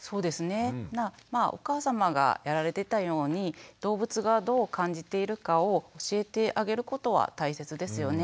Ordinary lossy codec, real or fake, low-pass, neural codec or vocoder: none; real; none; none